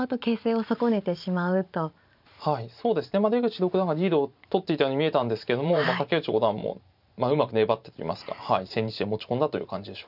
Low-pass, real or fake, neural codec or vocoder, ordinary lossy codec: 5.4 kHz; fake; vocoder, 44.1 kHz, 128 mel bands every 512 samples, BigVGAN v2; none